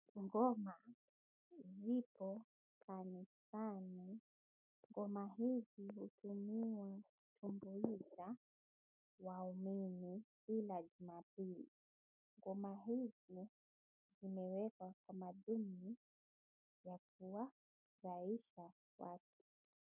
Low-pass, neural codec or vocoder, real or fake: 3.6 kHz; none; real